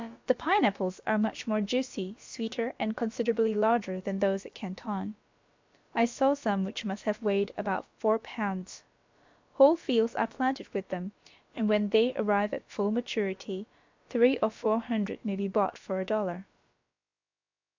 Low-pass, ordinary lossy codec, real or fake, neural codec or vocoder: 7.2 kHz; MP3, 64 kbps; fake; codec, 16 kHz, about 1 kbps, DyCAST, with the encoder's durations